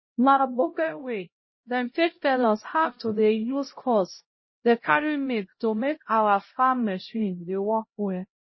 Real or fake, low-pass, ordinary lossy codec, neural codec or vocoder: fake; 7.2 kHz; MP3, 24 kbps; codec, 16 kHz, 0.5 kbps, X-Codec, HuBERT features, trained on LibriSpeech